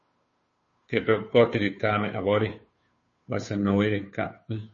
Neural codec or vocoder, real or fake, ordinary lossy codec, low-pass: codec, 16 kHz, 2 kbps, FunCodec, trained on Chinese and English, 25 frames a second; fake; MP3, 32 kbps; 7.2 kHz